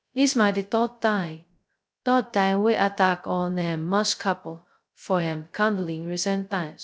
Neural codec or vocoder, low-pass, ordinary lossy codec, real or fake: codec, 16 kHz, 0.2 kbps, FocalCodec; none; none; fake